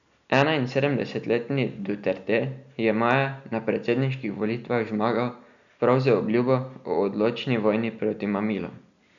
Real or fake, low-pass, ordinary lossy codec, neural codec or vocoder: real; 7.2 kHz; none; none